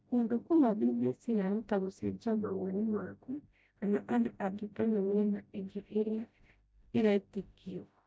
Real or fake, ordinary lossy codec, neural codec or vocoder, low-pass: fake; none; codec, 16 kHz, 0.5 kbps, FreqCodec, smaller model; none